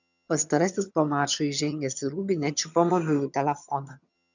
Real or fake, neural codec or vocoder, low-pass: fake; vocoder, 22.05 kHz, 80 mel bands, HiFi-GAN; 7.2 kHz